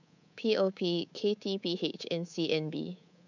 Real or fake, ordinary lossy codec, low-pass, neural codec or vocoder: fake; none; 7.2 kHz; codec, 24 kHz, 3.1 kbps, DualCodec